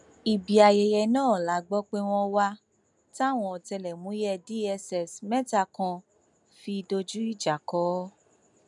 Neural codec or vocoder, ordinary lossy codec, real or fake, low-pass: none; none; real; 10.8 kHz